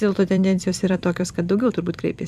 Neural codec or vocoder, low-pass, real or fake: vocoder, 44.1 kHz, 128 mel bands every 256 samples, BigVGAN v2; 14.4 kHz; fake